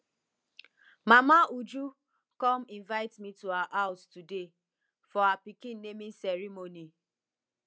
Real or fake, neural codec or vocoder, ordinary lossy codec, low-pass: real; none; none; none